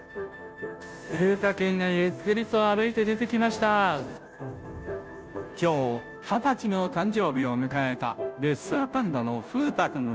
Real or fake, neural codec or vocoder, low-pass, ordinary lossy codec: fake; codec, 16 kHz, 0.5 kbps, FunCodec, trained on Chinese and English, 25 frames a second; none; none